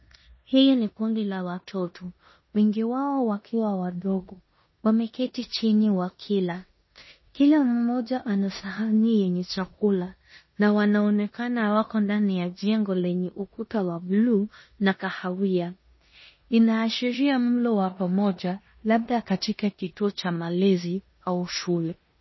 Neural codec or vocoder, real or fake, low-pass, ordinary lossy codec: codec, 16 kHz in and 24 kHz out, 0.9 kbps, LongCat-Audio-Codec, four codebook decoder; fake; 7.2 kHz; MP3, 24 kbps